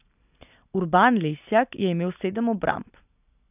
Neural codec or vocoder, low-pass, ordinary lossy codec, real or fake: none; 3.6 kHz; none; real